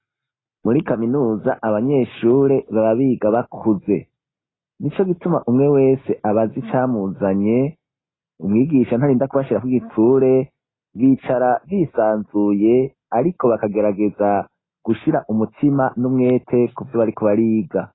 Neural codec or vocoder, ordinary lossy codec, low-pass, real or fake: none; AAC, 16 kbps; 7.2 kHz; real